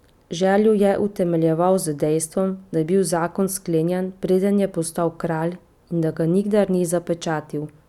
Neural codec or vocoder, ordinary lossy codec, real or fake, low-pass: none; none; real; 19.8 kHz